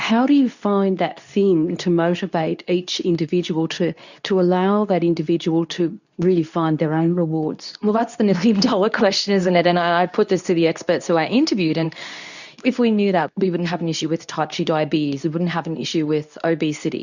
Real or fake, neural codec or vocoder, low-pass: fake; codec, 24 kHz, 0.9 kbps, WavTokenizer, medium speech release version 2; 7.2 kHz